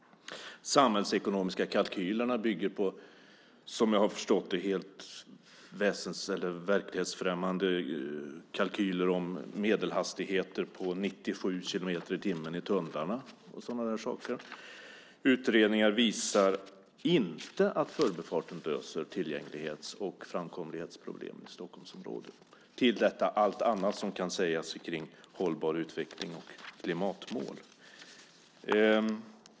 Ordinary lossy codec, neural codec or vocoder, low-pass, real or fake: none; none; none; real